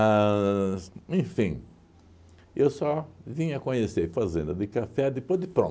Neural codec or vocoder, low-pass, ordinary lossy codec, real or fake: none; none; none; real